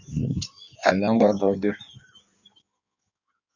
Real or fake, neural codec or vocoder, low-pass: fake; codec, 16 kHz in and 24 kHz out, 1.1 kbps, FireRedTTS-2 codec; 7.2 kHz